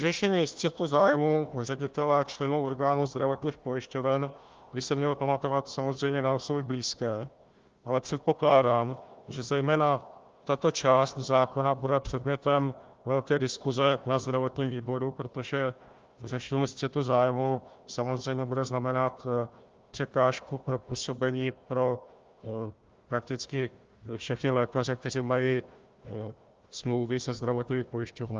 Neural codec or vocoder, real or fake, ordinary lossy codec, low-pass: codec, 16 kHz, 1 kbps, FunCodec, trained on Chinese and English, 50 frames a second; fake; Opus, 32 kbps; 7.2 kHz